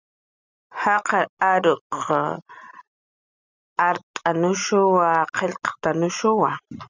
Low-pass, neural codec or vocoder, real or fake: 7.2 kHz; none; real